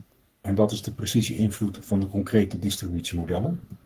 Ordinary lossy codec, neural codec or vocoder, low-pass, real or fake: Opus, 24 kbps; codec, 44.1 kHz, 3.4 kbps, Pupu-Codec; 14.4 kHz; fake